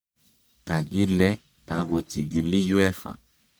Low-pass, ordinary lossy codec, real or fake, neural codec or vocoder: none; none; fake; codec, 44.1 kHz, 1.7 kbps, Pupu-Codec